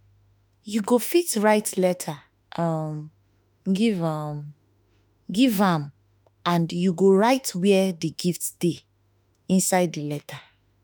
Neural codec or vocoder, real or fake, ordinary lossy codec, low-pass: autoencoder, 48 kHz, 32 numbers a frame, DAC-VAE, trained on Japanese speech; fake; none; none